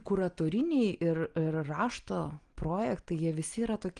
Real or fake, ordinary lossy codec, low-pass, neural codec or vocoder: real; Opus, 24 kbps; 9.9 kHz; none